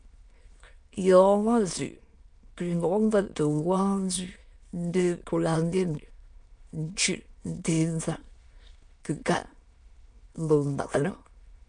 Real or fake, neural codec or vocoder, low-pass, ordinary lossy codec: fake; autoencoder, 22.05 kHz, a latent of 192 numbers a frame, VITS, trained on many speakers; 9.9 kHz; MP3, 64 kbps